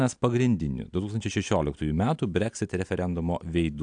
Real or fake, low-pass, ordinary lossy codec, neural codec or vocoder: real; 9.9 kHz; AAC, 64 kbps; none